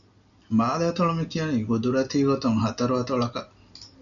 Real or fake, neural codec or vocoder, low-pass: real; none; 7.2 kHz